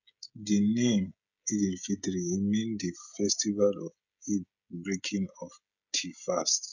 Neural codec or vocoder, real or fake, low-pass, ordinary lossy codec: codec, 16 kHz, 16 kbps, FreqCodec, smaller model; fake; 7.2 kHz; none